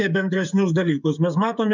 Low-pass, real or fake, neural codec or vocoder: 7.2 kHz; fake; codec, 16 kHz, 16 kbps, FreqCodec, smaller model